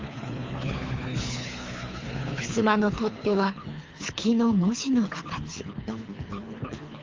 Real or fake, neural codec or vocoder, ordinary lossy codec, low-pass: fake; codec, 24 kHz, 3 kbps, HILCodec; Opus, 32 kbps; 7.2 kHz